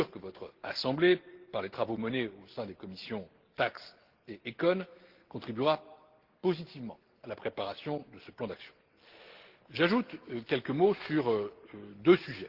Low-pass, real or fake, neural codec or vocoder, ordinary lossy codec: 5.4 kHz; real; none; Opus, 16 kbps